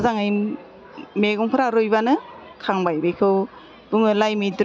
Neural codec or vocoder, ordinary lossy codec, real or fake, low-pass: none; none; real; none